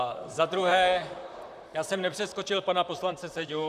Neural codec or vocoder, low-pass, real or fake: vocoder, 44.1 kHz, 128 mel bands, Pupu-Vocoder; 14.4 kHz; fake